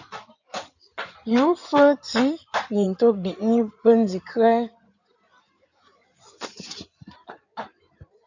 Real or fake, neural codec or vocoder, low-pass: fake; codec, 16 kHz in and 24 kHz out, 2.2 kbps, FireRedTTS-2 codec; 7.2 kHz